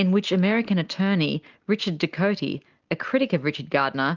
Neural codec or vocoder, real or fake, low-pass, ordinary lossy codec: none; real; 7.2 kHz; Opus, 32 kbps